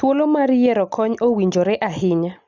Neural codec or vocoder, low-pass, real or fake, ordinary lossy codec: none; 7.2 kHz; real; Opus, 64 kbps